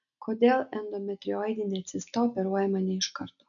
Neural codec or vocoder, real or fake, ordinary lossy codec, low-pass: none; real; MP3, 96 kbps; 7.2 kHz